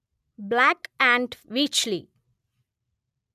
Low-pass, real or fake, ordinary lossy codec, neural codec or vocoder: 14.4 kHz; real; none; none